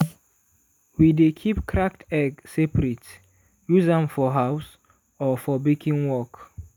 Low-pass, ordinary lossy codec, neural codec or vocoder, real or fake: none; none; none; real